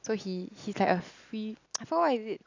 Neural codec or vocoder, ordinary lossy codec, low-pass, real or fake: none; AAC, 48 kbps; 7.2 kHz; real